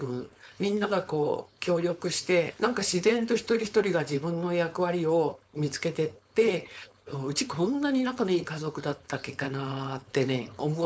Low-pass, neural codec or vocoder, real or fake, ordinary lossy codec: none; codec, 16 kHz, 4.8 kbps, FACodec; fake; none